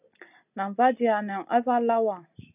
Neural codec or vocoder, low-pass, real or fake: none; 3.6 kHz; real